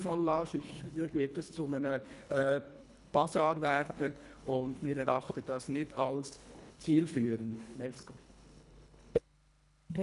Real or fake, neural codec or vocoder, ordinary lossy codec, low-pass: fake; codec, 24 kHz, 1.5 kbps, HILCodec; none; 10.8 kHz